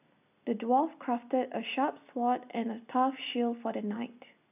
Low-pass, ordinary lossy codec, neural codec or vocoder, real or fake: 3.6 kHz; none; none; real